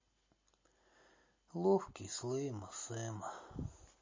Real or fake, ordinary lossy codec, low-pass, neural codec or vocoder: real; MP3, 32 kbps; 7.2 kHz; none